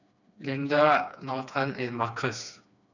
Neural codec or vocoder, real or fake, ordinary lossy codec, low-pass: codec, 16 kHz, 2 kbps, FreqCodec, smaller model; fake; none; 7.2 kHz